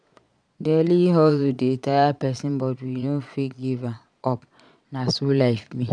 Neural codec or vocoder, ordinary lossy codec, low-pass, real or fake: vocoder, 22.05 kHz, 80 mel bands, Vocos; none; 9.9 kHz; fake